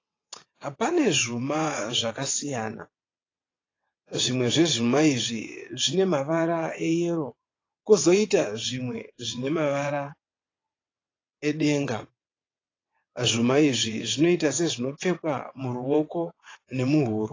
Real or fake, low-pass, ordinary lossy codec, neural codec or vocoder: fake; 7.2 kHz; AAC, 32 kbps; vocoder, 22.05 kHz, 80 mel bands, WaveNeXt